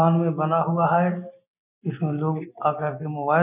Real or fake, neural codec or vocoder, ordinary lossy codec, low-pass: real; none; none; 3.6 kHz